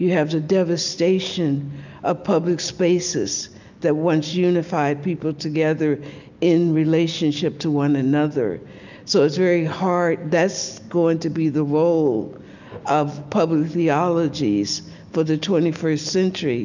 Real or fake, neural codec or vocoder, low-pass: real; none; 7.2 kHz